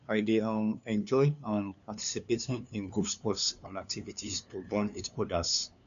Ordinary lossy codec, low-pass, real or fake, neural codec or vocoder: none; 7.2 kHz; fake; codec, 16 kHz, 2 kbps, FunCodec, trained on LibriTTS, 25 frames a second